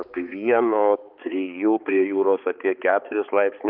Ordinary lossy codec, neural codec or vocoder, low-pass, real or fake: Opus, 24 kbps; codec, 16 kHz, 4 kbps, X-Codec, HuBERT features, trained on balanced general audio; 5.4 kHz; fake